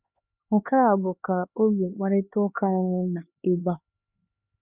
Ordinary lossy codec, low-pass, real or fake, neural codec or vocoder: none; 3.6 kHz; fake; codec, 16 kHz, 2 kbps, X-Codec, HuBERT features, trained on LibriSpeech